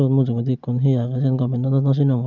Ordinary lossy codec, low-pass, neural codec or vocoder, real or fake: none; 7.2 kHz; none; real